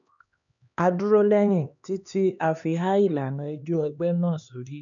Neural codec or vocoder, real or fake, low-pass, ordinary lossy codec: codec, 16 kHz, 2 kbps, X-Codec, HuBERT features, trained on LibriSpeech; fake; 7.2 kHz; none